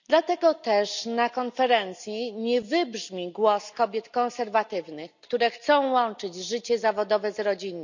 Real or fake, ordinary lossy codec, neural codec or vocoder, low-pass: real; none; none; 7.2 kHz